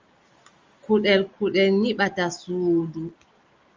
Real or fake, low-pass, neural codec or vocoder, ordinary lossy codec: real; 7.2 kHz; none; Opus, 32 kbps